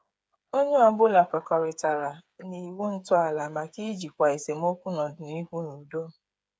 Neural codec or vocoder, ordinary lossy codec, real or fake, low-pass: codec, 16 kHz, 8 kbps, FreqCodec, smaller model; none; fake; none